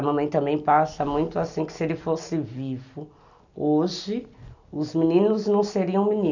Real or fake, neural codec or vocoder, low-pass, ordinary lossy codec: real; none; 7.2 kHz; none